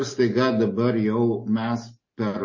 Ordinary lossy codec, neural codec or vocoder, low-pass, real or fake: MP3, 32 kbps; none; 7.2 kHz; real